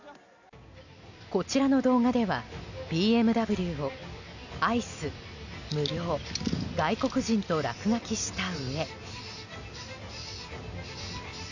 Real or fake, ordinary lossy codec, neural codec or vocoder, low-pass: real; none; none; 7.2 kHz